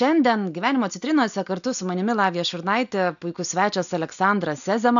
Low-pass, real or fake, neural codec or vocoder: 7.2 kHz; real; none